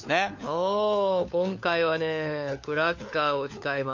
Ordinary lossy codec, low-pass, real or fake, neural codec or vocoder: MP3, 48 kbps; 7.2 kHz; fake; codec, 16 kHz, 4 kbps, FunCodec, trained on LibriTTS, 50 frames a second